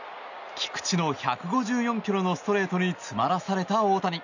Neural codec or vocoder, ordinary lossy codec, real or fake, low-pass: none; none; real; 7.2 kHz